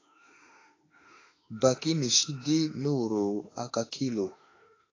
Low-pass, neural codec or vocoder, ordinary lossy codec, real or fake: 7.2 kHz; autoencoder, 48 kHz, 32 numbers a frame, DAC-VAE, trained on Japanese speech; AAC, 32 kbps; fake